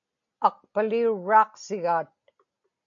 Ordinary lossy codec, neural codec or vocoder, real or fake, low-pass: MP3, 96 kbps; none; real; 7.2 kHz